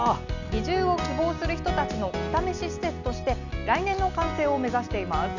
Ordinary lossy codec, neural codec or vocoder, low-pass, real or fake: none; none; 7.2 kHz; real